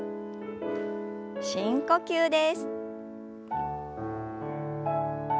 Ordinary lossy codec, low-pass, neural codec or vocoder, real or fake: none; none; none; real